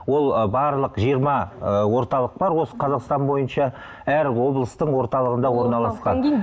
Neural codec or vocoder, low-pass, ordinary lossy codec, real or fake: none; none; none; real